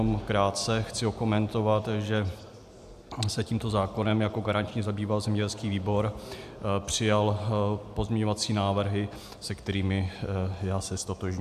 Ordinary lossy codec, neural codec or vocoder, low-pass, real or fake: AAC, 96 kbps; none; 14.4 kHz; real